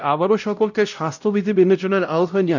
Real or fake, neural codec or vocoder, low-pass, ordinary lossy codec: fake; codec, 16 kHz, 0.5 kbps, X-Codec, WavLM features, trained on Multilingual LibriSpeech; 7.2 kHz; none